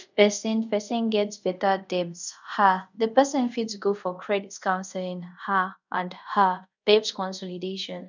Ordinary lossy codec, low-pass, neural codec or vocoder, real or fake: none; 7.2 kHz; codec, 24 kHz, 0.5 kbps, DualCodec; fake